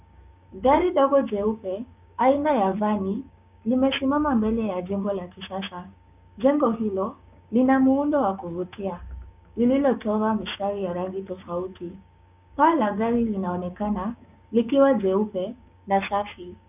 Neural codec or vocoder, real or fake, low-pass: codec, 16 kHz, 6 kbps, DAC; fake; 3.6 kHz